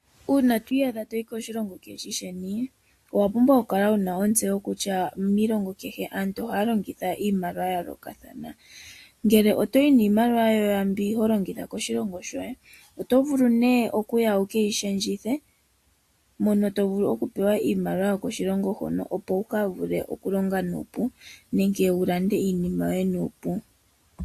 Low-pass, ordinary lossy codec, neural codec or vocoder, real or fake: 14.4 kHz; AAC, 64 kbps; none; real